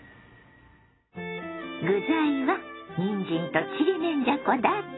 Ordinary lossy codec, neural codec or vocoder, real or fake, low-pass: AAC, 16 kbps; none; real; 7.2 kHz